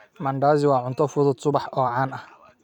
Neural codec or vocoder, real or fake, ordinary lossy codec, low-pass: vocoder, 44.1 kHz, 128 mel bands every 256 samples, BigVGAN v2; fake; none; 19.8 kHz